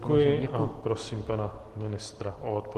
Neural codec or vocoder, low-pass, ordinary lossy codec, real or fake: none; 14.4 kHz; Opus, 16 kbps; real